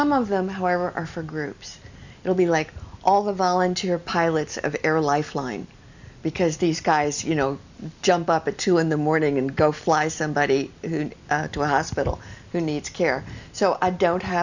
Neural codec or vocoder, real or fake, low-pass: none; real; 7.2 kHz